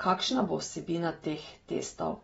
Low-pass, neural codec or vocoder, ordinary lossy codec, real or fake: 10.8 kHz; vocoder, 24 kHz, 100 mel bands, Vocos; AAC, 24 kbps; fake